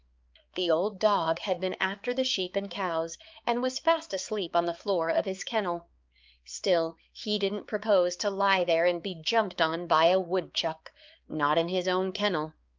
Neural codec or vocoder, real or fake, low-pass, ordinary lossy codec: codec, 16 kHz, 4 kbps, X-Codec, HuBERT features, trained on balanced general audio; fake; 7.2 kHz; Opus, 24 kbps